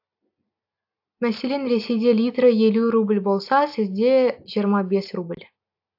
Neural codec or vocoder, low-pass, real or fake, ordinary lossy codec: none; 5.4 kHz; real; AAC, 48 kbps